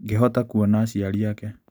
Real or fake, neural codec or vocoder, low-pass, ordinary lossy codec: real; none; none; none